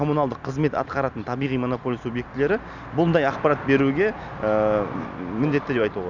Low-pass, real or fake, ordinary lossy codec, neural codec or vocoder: 7.2 kHz; real; none; none